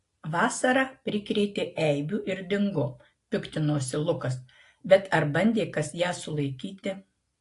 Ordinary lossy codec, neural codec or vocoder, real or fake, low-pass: AAC, 48 kbps; none; real; 10.8 kHz